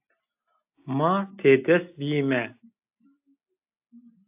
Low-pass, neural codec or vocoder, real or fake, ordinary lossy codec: 3.6 kHz; none; real; AAC, 32 kbps